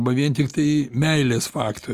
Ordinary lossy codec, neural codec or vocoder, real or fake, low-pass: Opus, 32 kbps; none; real; 14.4 kHz